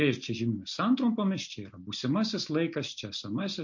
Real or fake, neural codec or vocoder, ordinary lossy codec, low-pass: real; none; MP3, 48 kbps; 7.2 kHz